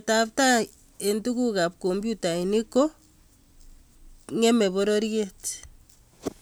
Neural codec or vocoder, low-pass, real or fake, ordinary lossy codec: none; none; real; none